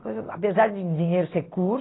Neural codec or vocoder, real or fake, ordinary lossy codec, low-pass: none; real; AAC, 16 kbps; 7.2 kHz